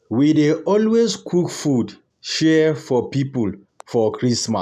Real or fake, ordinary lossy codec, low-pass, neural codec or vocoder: real; none; 14.4 kHz; none